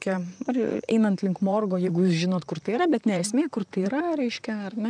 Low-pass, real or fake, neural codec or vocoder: 9.9 kHz; fake; vocoder, 44.1 kHz, 128 mel bands, Pupu-Vocoder